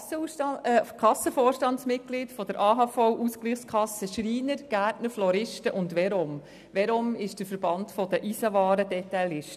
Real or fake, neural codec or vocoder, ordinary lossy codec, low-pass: real; none; none; 14.4 kHz